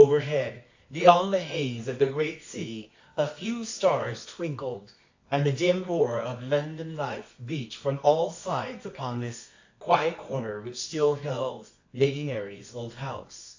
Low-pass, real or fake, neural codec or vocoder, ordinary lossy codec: 7.2 kHz; fake; codec, 24 kHz, 0.9 kbps, WavTokenizer, medium music audio release; AAC, 48 kbps